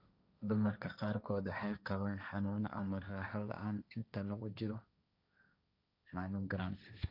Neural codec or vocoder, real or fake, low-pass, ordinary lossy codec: codec, 16 kHz, 1.1 kbps, Voila-Tokenizer; fake; 5.4 kHz; none